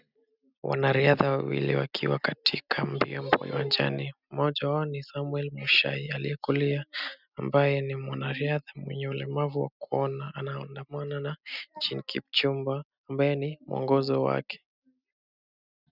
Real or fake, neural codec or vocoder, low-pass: real; none; 5.4 kHz